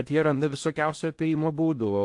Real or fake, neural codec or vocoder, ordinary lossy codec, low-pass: fake; codec, 16 kHz in and 24 kHz out, 0.8 kbps, FocalCodec, streaming, 65536 codes; AAC, 64 kbps; 10.8 kHz